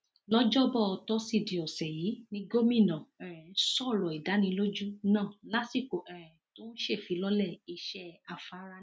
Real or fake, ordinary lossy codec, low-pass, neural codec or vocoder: real; none; none; none